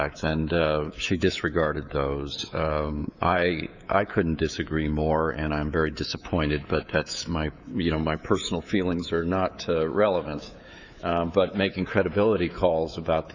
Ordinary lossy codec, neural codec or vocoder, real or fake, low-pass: Opus, 64 kbps; codec, 24 kHz, 3.1 kbps, DualCodec; fake; 7.2 kHz